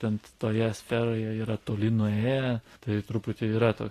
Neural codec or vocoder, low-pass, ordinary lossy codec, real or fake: none; 14.4 kHz; AAC, 48 kbps; real